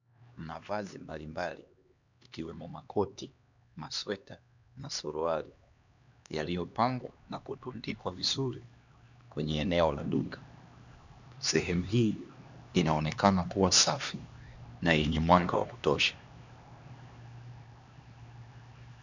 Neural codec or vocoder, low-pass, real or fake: codec, 16 kHz, 2 kbps, X-Codec, HuBERT features, trained on LibriSpeech; 7.2 kHz; fake